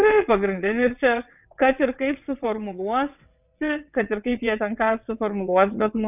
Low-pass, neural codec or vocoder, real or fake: 3.6 kHz; vocoder, 22.05 kHz, 80 mel bands, WaveNeXt; fake